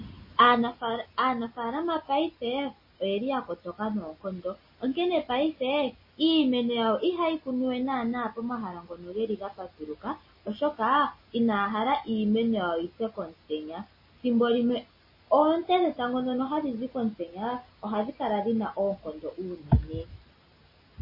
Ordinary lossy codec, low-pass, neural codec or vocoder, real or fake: MP3, 24 kbps; 5.4 kHz; none; real